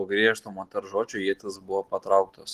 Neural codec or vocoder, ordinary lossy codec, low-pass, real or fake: none; Opus, 16 kbps; 14.4 kHz; real